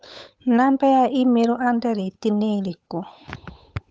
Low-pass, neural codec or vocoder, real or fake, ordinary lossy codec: none; codec, 16 kHz, 8 kbps, FunCodec, trained on Chinese and English, 25 frames a second; fake; none